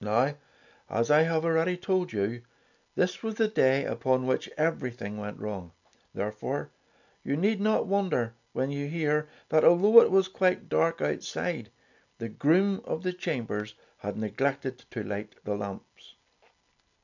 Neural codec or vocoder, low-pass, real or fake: none; 7.2 kHz; real